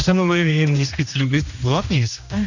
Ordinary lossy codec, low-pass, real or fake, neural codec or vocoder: none; 7.2 kHz; fake; codec, 16 kHz, 1 kbps, X-Codec, HuBERT features, trained on general audio